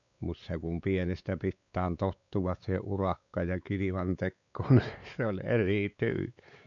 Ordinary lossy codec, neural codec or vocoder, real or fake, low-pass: none; codec, 16 kHz, 4 kbps, X-Codec, WavLM features, trained on Multilingual LibriSpeech; fake; 7.2 kHz